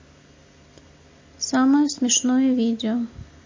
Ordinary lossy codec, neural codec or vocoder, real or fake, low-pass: MP3, 32 kbps; none; real; 7.2 kHz